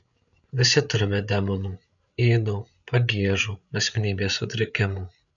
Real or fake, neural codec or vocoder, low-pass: fake; codec, 16 kHz, 8 kbps, FreqCodec, larger model; 7.2 kHz